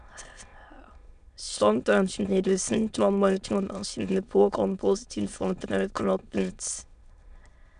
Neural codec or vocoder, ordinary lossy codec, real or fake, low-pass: autoencoder, 22.05 kHz, a latent of 192 numbers a frame, VITS, trained on many speakers; none; fake; 9.9 kHz